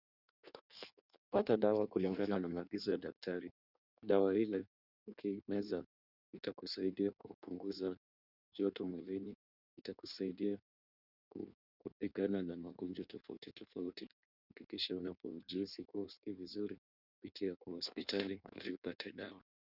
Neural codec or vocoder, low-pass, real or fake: codec, 16 kHz in and 24 kHz out, 1.1 kbps, FireRedTTS-2 codec; 5.4 kHz; fake